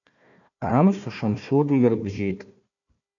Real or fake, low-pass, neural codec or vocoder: fake; 7.2 kHz; codec, 16 kHz, 1 kbps, FunCodec, trained on Chinese and English, 50 frames a second